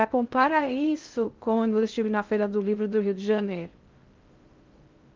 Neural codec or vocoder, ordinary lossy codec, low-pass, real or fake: codec, 16 kHz in and 24 kHz out, 0.6 kbps, FocalCodec, streaming, 2048 codes; Opus, 24 kbps; 7.2 kHz; fake